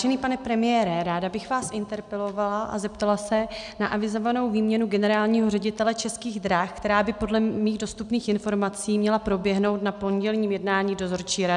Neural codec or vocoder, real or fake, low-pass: none; real; 10.8 kHz